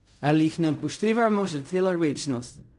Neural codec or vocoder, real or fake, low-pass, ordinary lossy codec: codec, 16 kHz in and 24 kHz out, 0.4 kbps, LongCat-Audio-Codec, fine tuned four codebook decoder; fake; 10.8 kHz; MP3, 96 kbps